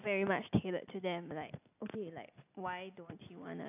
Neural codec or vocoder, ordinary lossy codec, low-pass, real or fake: none; AAC, 32 kbps; 3.6 kHz; real